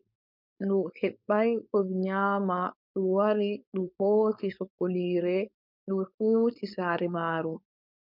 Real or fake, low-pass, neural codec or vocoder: fake; 5.4 kHz; codec, 16 kHz, 4.8 kbps, FACodec